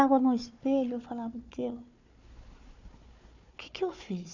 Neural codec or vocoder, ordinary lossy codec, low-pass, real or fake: codec, 16 kHz, 4 kbps, FunCodec, trained on Chinese and English, 50 frames a second; none; 7.2 kHz; fake